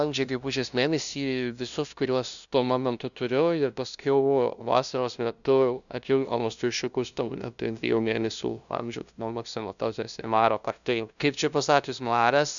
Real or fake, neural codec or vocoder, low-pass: fake; codec, 16 kHz, 0.5 kbps, FunCodec, trained on LibriTTS, 25 frames a second; 7.2 kHz